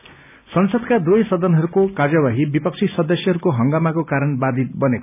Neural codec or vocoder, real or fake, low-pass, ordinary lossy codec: none; real; 3.6 kHz; none